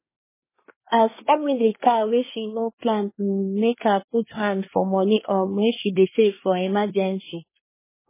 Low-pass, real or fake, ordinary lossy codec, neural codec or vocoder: 3.6 kHz; fake; MP3, 16 kbps; codec, 24 kHz, 1 kbps, SNAC